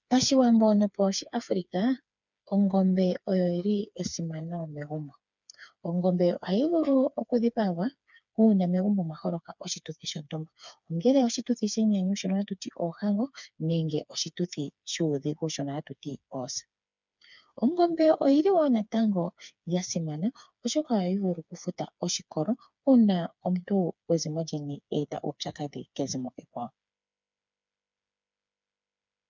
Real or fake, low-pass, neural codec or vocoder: fake; 7.2 kHz; codec, 16 kHz, 4 kbps, FreqCodec, smaller model